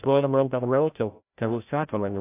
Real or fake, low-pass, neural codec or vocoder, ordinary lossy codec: fake; 3.6 kHz; codec, 16 kHz, 0.5 kbps, FreqCodec, larger model; AAC, 24 kbps